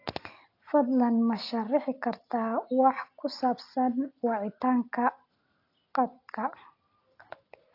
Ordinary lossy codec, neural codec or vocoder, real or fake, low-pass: AAC, 32 kbps; none; real; 5.4 kHz